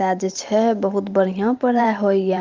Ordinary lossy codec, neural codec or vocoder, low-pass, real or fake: Opus, 32 kbps; vocoder, 44.1 kHz, 128 mel bands every 512 samples, BigVGAN v2; 7.2 kHz; fake